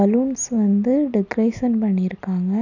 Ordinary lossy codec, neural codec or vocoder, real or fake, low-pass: none; none; real; 7.2 kHz